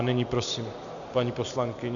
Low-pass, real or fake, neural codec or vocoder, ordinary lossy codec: 7.2 kHz; real; none; MP3, 96 kbps